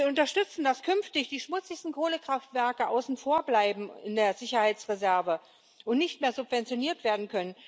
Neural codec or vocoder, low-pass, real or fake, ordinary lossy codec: none; none; real; none